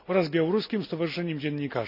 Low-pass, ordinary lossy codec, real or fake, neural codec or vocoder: 5.4 kHz; none; real; none